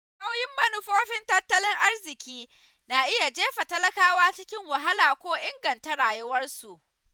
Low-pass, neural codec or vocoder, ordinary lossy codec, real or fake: none; vocoder, 48 kHz, 128 mel bands, Vocos; none; fake